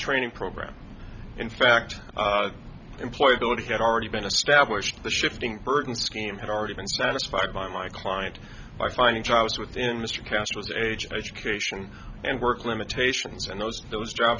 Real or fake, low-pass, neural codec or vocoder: real; 7.2 kHz; none